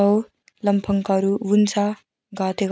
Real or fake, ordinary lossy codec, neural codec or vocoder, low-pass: real; none; none; none